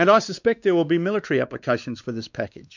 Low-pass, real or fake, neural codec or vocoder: 7.2 kHz; fake; codec, 16 kHz, 2 kbps, X-Codec, WavLM features, trained on Multilingual LibriSpeech